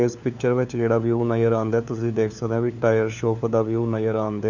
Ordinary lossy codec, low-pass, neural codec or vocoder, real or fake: none; 7.2 kHz; codec, 16 kHz, 4 kbps, FunCodec, trained on LibriTTS, 50 frames a second; fake